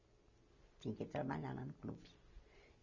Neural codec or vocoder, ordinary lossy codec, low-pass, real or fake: none; none; 7.2 kHz; real